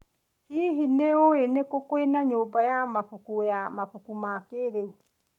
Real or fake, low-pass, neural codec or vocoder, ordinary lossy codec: fake; 19.8 kHz; codec, 44.1 kHz, 7.8 kbps, Pupu-Codec; none